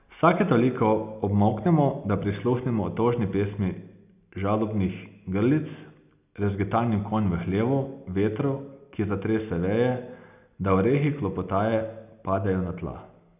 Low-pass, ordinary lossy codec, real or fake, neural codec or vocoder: 3.6 kHz; none; real; none